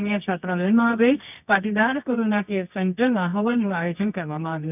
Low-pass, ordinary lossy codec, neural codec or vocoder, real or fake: 3.6 kHz; none; codec, 24 kHz, 0.9 kbps, WavTokenizer, medium music audio release; fake